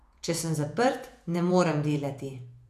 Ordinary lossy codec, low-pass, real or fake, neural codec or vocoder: none; 14.4 kHz; fake; vocoder, 48 kHz, 128 mel bands, Vocos